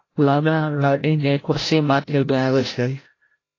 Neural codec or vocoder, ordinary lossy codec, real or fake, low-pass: codec, 16 kHz, 0.5 kbps, FreqCodec, larger model; AAC, 32 kbps; fake; 7.2 kHz